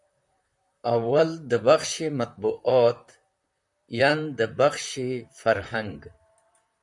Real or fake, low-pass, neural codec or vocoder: fake; 10.8 kHz; vocoder, 44.1 kHz, 128 mel bands, Pupu-Vocoder